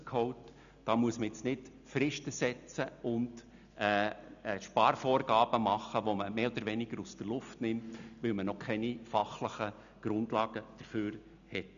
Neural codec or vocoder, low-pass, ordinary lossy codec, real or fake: none; 7.2 kHz; none; real